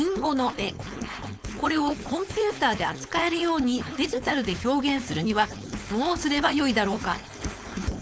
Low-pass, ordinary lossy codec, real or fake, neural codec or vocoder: none; none; fake; codec, 16 kHz, 4.8 kbps, FACodec